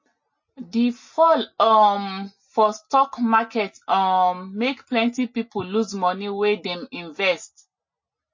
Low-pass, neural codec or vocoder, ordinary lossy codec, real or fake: 7.2 kHz; none; MP3, 32 kbps; real